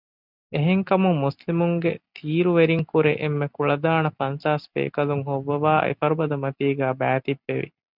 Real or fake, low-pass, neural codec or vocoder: real; 5.4 kHz; none